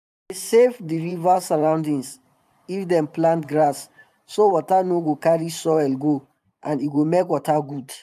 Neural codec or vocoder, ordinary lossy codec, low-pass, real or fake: vocoder, 44.1 kHz, 128 mel bands every 512 samples, BigVGAN v2; AAC, 96 kbps; 14.4 kHz; fake